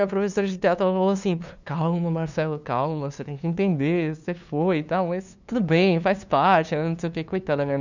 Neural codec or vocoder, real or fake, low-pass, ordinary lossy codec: codec, 16 kHz, 2 kbps, FunCodec, trained on LibriTTS, 25 frames a second; fake; 7.2 kHz; none